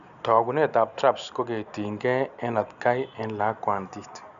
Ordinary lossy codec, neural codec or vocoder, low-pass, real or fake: none; none; 7.2 kHz; real